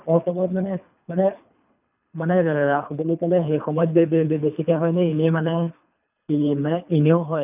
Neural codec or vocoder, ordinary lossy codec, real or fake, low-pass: codec, 24 kHz, 3 kbps, HILCodec; none; fake; 3.6 kHz